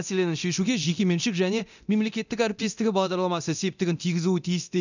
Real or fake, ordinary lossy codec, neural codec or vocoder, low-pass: fake; none; codec, 24 kHz, 0.9 kbps, DualCodec; 7.2 kHz